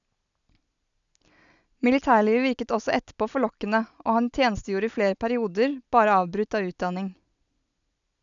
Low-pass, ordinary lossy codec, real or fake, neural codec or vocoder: 7.2 kHz; none; real; none